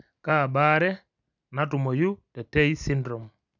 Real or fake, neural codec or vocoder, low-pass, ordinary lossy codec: real; none; 7.2 kHz; none